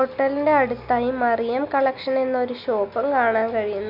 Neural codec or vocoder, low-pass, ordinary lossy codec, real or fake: none; 5.4 kHz; AAC, 48 kbps; real